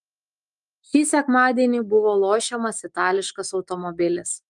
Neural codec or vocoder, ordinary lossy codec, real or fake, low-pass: autoencoder, 48 kHz, 128 numbers a frame, DAC-VAE, trained on Japanese speech; Opus, 32 kbps; fake; 10.8 kHz